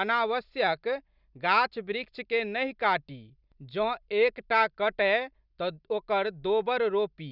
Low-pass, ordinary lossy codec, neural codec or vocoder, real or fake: 5.4 kHz; none; none; real